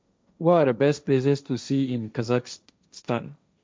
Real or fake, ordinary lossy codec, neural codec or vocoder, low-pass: fake; none; codec, 16 kHz, 1.1 kbps, Voila-Tokenizer; none